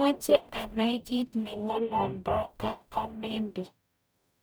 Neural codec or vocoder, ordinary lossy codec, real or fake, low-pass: codec, 44.1 kHz, 0.9 kbps, DAC; none; fake; none